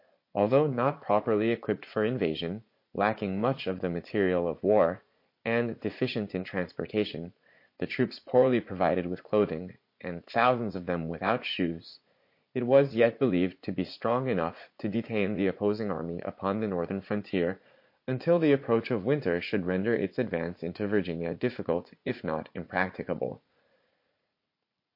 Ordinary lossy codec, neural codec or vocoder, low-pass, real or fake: MP3, 32 kbps; vocoder, 44.1 kHz, 80 mel bands, Vocos; 5.4 kHz; fake